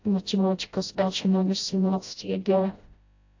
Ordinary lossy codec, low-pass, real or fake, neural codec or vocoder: AAC, 48 kbps; 7.2 kHz; fake; codec, 16 kHz, 0.5 kbps, FreqCodec, smaller model